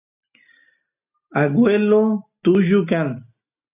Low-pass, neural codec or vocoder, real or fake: 3.6 kHz; none; real